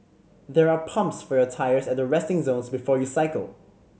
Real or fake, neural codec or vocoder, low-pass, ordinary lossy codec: real; none; none; none